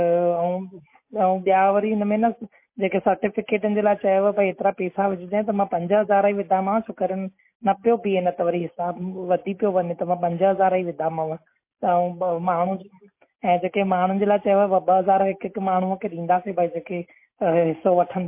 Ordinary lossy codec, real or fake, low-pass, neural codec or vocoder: MP3, 24 kbps; real; 3.6 kHz; none